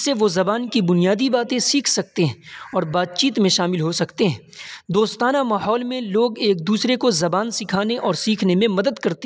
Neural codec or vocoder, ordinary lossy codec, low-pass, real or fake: none; none; none; real